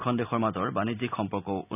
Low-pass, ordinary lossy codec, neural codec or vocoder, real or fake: 3.6 kHz; none; none; real